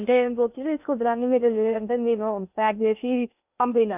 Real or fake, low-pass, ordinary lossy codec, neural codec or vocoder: fake; 3.6 kHz; none; codec, 16 kHz in and 24 kHz out, 0.6 kbps, FocalCodec, streaming, 2048 codes